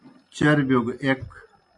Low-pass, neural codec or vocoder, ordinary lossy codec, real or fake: 10.8 kHz; none; MP3, 48 kbps; real